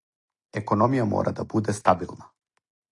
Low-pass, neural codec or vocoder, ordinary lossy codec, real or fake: 10.8 kHz; none; AAC, 48 kbps; real